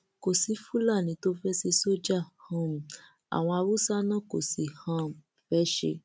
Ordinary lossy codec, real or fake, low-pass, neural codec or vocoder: none; real; none; none